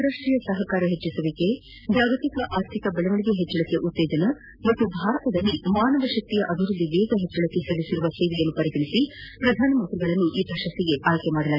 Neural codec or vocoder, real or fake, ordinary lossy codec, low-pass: none; real; none; 5.4 kHz